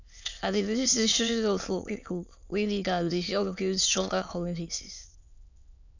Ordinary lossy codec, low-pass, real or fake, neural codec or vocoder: none; 7.2 kHz; fake; autoencoder, 22.05 kHz, a latent of 192 numbers a frame, VITS, trained on many speakers